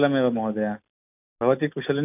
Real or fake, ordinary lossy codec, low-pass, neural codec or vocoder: real; AAC, 24 kbps; 3.6 kHz; none